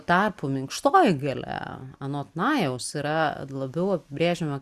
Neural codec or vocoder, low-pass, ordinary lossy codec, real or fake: none; 14.4 kHz; Opus, 64 kbps; real